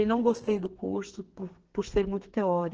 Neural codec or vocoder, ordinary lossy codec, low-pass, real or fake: codec, 32 kHz, 1.9 kbps, SNAC; Opus, 16 kbps; 7.2 kHz; fake